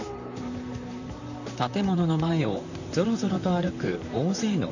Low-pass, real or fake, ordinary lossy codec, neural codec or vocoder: 7.2 kHz; fake; none; vocoder, 44.1 kHz, 128 mel bands, Pupu-Vocoder